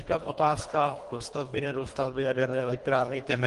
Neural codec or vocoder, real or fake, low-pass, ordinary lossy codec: codec, 24 kHz, 1.5 kbps, HILCodec; fake; 10.8 kHz; Opus, 24 kbps